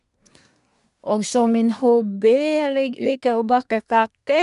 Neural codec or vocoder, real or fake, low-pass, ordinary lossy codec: codec, 24 kHz, 1 kbps, SNAC; fake; 10.8 kHz; none